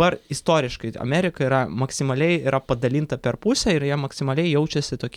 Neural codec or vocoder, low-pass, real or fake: none; 19.8 kHz; real